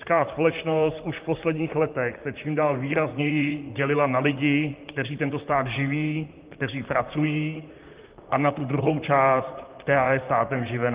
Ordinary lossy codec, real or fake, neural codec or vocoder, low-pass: Opus, 32 kbps; fake; vocoder, 44.1 kHz, 128 mel bands, Pupu-Vocoder; 3.6 kHz